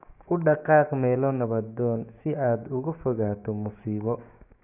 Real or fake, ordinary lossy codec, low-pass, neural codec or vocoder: fake; none; 3.6 kHz; codec, 16 kHz, 6 kbps, DAC